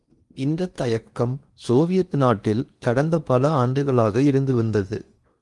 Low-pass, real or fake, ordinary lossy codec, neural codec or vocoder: 10.8 kHz; fake; Opus, 32 kbps; codec, 16 kHz in and 24 kHz out, 0.8 kbps, FocalCodec, streaming, 65536 codes